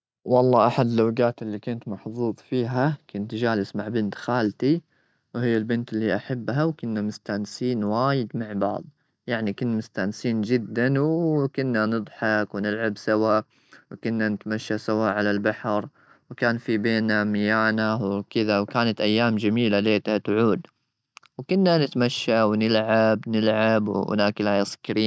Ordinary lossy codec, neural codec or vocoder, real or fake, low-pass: none; none; real; none